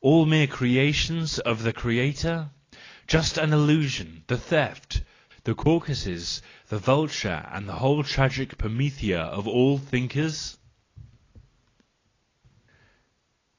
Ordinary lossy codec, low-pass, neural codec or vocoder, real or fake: AAC, 32 kbps; 7.2 kHz; none; real